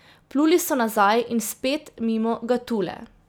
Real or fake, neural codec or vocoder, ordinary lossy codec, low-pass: real; none; none; none